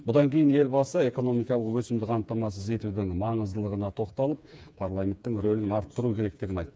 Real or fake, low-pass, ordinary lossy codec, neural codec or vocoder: fake; none; none; codec, 16 kHz, 4 kbps, FreqCodec, smaller model